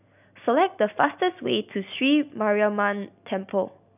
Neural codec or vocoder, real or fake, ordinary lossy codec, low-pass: none; real; none; 3.6 kHz